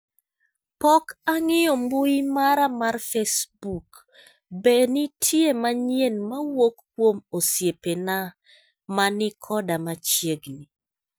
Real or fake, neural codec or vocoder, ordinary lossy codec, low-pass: real; none; none; none